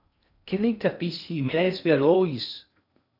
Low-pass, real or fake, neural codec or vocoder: 5.4 kHz; fake; codec, 16 kHz in and 24 kHz out, 0.6 kbps, FocalCodec, streaming, 2048 codes